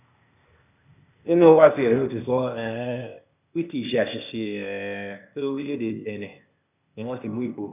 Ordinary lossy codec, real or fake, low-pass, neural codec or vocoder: none; fake; 3.6 kHz; codec, 16 kHz, 0.8 kbps, ZipCodec